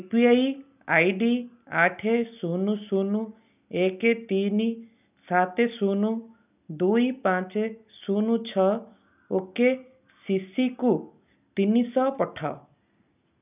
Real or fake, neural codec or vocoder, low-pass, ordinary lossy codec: real; none; 3.6 kHz; none